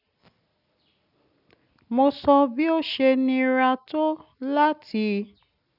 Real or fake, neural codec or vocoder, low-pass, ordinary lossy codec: real; none; 5.4 kHz; none